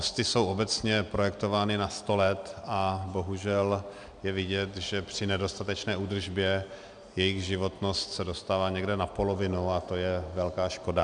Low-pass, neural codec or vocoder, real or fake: 9.9 kHz; none; real